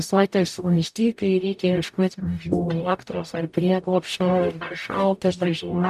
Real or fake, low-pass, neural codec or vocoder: fake; 14.4 kHz; codec, 44.1 kHz, 0.9 kbps, DAC